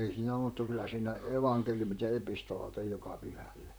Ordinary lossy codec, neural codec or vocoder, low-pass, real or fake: none; vocoder, 44.1 kHz, 128 mel bands, Pupu-Vocoder; none; fake